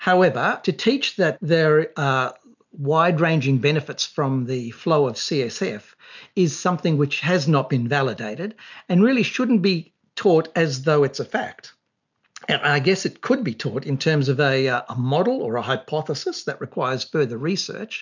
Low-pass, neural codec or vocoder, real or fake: 7.2 kHz; none; real